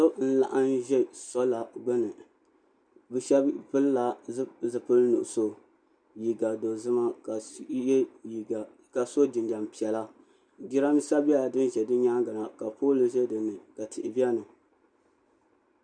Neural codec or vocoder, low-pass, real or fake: none; 9.9 kHz; real